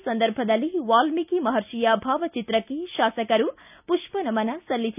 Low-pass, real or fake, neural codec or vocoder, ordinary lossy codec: 3.6 kHz; real; none; none